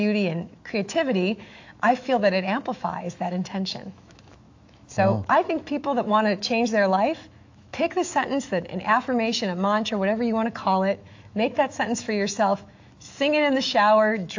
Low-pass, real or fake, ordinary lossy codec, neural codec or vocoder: 7.2 kHz; fake; AAC, 48 kbps; autoencoder, 48 kHz, 128 numbers a frame, DAC-VAE, trained on Japanese speech